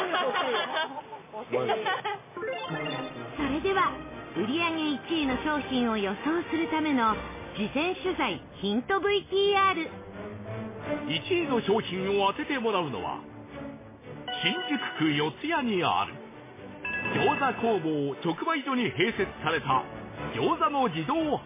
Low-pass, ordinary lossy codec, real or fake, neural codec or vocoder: 3.6 kHz; MP3, 16 kbps; real; none